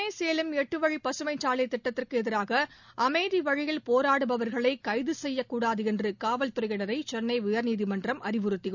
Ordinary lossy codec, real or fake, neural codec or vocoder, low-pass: none; real; none; 7.2 kHz